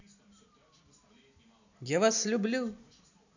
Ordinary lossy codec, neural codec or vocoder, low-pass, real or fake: none; none; 7.2 kHz; real